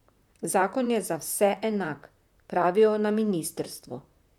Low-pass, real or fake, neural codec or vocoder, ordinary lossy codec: 19.8 kHz; fake; vocoder, 44.1 kHz, 128 mel bands, Pupu-Vocoder; none